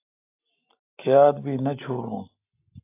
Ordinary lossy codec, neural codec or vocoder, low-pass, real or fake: AAC, 24 kbps; none; 3.6 kHz; real